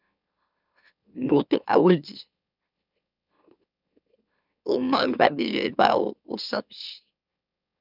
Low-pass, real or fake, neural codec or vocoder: 5.4 kHz; fake; autoencoder, 44.1 kHz, a latent of 192 numbers a frame, MeloTTS